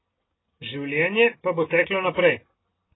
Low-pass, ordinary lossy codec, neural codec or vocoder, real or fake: 7.2 kHz; AAC, 16 kbps; none; real